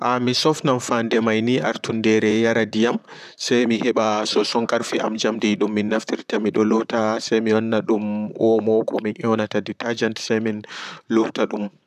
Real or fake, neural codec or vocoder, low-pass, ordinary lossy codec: fake; vocoder, 44.1 kHz, 128 mel bands, Pupu-Vocoder; 14.4 kHz; none